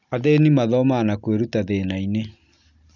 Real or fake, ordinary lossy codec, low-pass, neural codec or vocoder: real; none; 7.2 kHz; none